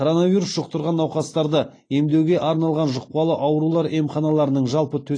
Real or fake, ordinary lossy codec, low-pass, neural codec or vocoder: real; AAC, 32 kbps; 9.9 kHz; none